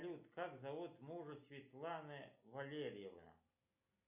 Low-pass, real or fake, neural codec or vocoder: 3.6 kHz; real; none